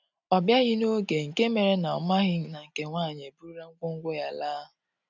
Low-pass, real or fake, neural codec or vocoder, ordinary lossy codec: 7.2 kHz; real; none; none